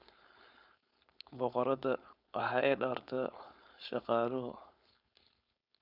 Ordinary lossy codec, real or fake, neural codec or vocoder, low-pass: none; fake; codec, 16 kHz, 4.8 kbps, FACodec; 5.4 kHz